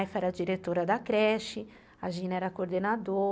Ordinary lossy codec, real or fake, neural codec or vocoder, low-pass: none; real; none; none